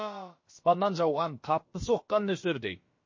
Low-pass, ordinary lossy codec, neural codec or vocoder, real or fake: 7.2 kHz; MP3, 32 kbps; codec, 16 kHz, about 1 kbps, DyCAST, with the encoder's durations; fake